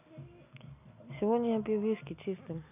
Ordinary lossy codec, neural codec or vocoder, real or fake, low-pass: none; none; real; 3.6 kHz